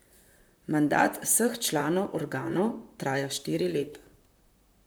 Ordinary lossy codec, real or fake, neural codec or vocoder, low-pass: none; fake; vocoder, 44.1 kHz, 128 mel bands, Pupu-Vocoder; none